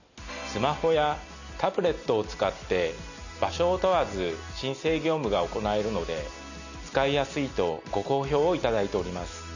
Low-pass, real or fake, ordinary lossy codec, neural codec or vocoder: 7.2 kHz; real; none; none